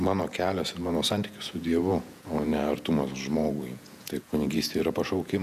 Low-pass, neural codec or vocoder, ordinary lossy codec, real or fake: 14.4 kHz; vocoder, 48 kHz, 128 mel bands, Vocos; MP3, 96 kbps; fake